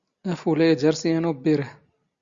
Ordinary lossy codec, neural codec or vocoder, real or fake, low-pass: Opus, 64 kbps; none; real; 7.2 kHz